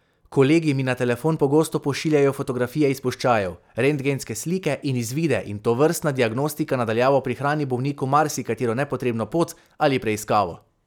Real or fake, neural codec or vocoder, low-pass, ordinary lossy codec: real; none; 19.8 kHz; none